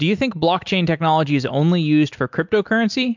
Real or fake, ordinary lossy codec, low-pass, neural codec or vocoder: real; MP3, 64 kbps; 7.2 kHz; none